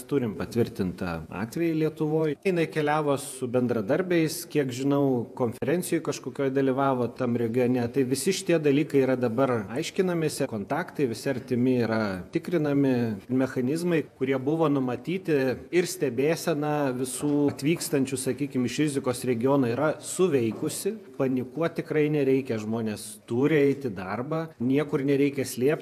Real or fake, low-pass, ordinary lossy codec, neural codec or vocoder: fake; 14.4 kHz; AAC, 96 kbps; vocoder, 48 kHz, 128 mel bands, Vocos